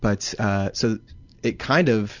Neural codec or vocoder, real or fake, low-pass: none; real; 7.2 kHz